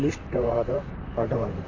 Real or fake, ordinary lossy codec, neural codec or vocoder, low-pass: fake; MP3, 32 kbps; vocoder, 44.1 kHz, 128 mel bands, Pupu-Vocoder; 7.2 kHz